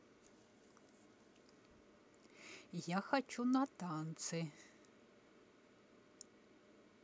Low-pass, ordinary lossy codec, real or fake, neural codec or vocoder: none; none; real; none